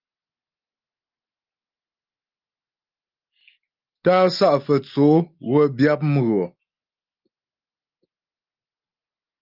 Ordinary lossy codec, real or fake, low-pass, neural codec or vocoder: Opus, 24 kbps; real; 5.4 kHz; none